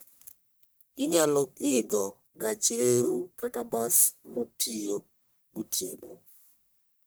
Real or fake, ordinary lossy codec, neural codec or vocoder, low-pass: fake; none; codec, 44.1 kHz, 1.7 kbps, Pupu-Codec; none